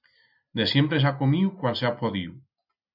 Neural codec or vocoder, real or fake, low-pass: none; real; 5.4 kHz